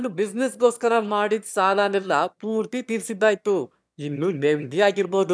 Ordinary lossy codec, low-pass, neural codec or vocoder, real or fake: none; none; autoencoder, 22.05 kHz, a latent of 192 numbers a frame, VITS, trained on one speaker; fake